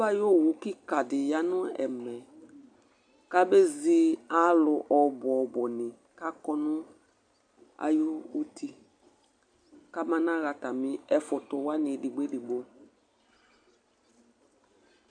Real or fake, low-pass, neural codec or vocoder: real; 9.9 kHz; none